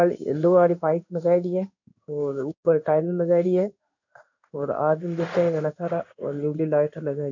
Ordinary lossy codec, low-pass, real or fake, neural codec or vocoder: none; 7.2 kHz; fake; codec, 16 kHz in and 24 kHz out, 1 kbps, XY-Tokenizer